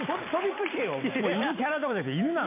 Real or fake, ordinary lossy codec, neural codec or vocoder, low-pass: real; MP3, 24 kbps; none; 3.6 kHz